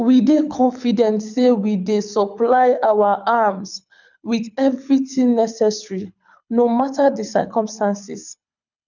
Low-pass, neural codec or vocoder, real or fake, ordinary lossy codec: 7.2 kHz; codec, 24 kHz, 6 kbps, HILCodec; fake; none